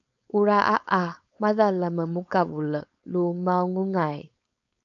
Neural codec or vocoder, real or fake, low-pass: codec, 16 kHz, 4.8 kbps, FACodec; fake; 7.2 kHz